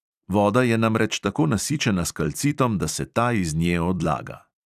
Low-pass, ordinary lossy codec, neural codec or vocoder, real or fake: 14.4 kHz; none; vocoder, 44.1 kHz, 128 mel bands every 256 samples, BigVGAN v2; fake